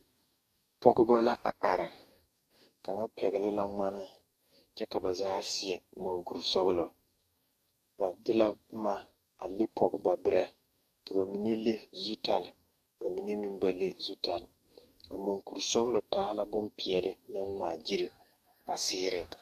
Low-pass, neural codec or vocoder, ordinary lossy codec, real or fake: 14.4 kHz; codec, 44.1 kHz, 2.6 kbps, DAC; AAC, 96 kbps; fake